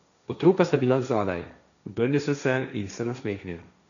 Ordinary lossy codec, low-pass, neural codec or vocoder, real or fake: none; 7.2 kHz; codec, 16 kHz, 1.1 kbps, Voila-Tokenizer; fake